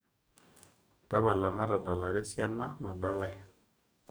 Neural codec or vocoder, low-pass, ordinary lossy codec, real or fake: codec, 44.1 kHz, 2.6 kbps, DAC; none; none; fake